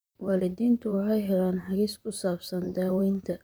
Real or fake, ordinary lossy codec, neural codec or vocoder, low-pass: fake; none; vocoder, 44.1 kHz, 128 mel bands, Pupu-Vocoder; none